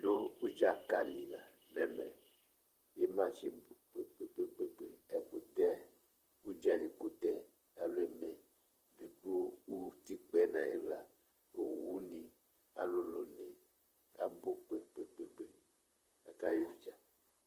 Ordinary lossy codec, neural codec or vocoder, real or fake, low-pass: Opus, 24 kbps; vocoder, 44.1 kHz, 128 mel bands, Pupu-Vocoder; fake; 14.4 kHz